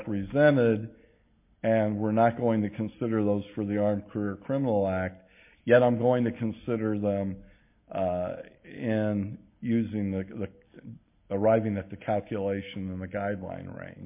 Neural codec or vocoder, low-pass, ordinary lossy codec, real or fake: none; 3.6 kHz; MP3, 32 kbps; real